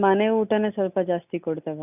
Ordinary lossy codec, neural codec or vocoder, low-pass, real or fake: none; none; 3.6 kHz; real